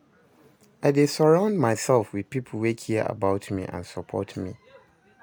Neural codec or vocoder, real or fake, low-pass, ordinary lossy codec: none; real; none; none